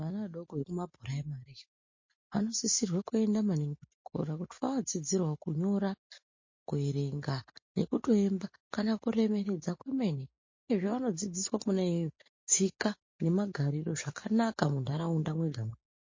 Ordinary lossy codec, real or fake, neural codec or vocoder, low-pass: MP3, 32 kbps; real; none; 7.2 kHz